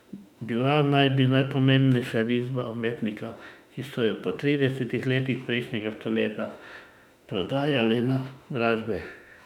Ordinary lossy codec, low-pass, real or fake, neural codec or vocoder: none; 19.8 kHz; fake; autoencoder, 48 kHz, 32 numbers a frame, DAC-VAE, trained on Japanese speech